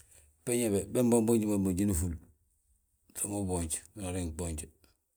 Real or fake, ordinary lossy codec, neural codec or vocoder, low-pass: real; none; none; none